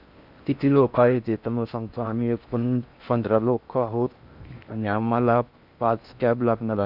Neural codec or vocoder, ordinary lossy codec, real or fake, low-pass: codec, 16 kHz in and 24 kHz out, 0.6 kbps, FocalCodec, streaming, 4096 codes; none; fake; 5.4 kHz